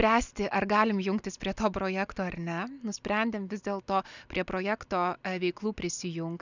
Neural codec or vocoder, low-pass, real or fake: none; 7.2 kHz; real